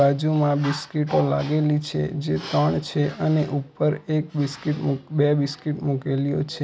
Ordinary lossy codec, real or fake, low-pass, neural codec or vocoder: none; real; none; none